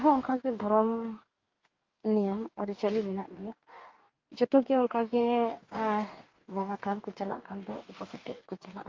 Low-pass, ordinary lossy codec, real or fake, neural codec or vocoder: 7.2 kHz; Opus, 24 kbps; fake; codec, 44.1 kHz, 2.6 kbps, DAC